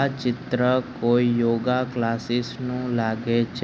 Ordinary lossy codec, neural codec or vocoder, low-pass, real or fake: none; none; none; real